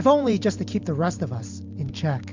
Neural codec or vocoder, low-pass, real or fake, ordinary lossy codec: vocoder, 44.1 kHz, 128 mel bands every 512 samples, BigVGAN v2; 7.2 kHz; fake; MP3, 64 kbps